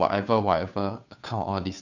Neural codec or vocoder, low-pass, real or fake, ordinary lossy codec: codec, 16 kHz, 2 kbps, FunCodec, trained on Chinese and English, 25 frames a second; 7.2 kHz; fake; none